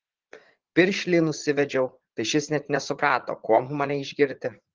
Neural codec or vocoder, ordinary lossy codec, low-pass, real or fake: vocoder, 44.1 kHz, 80 mel bands, Vocos; Opus, 16 kbps; 7.2 kHz; fake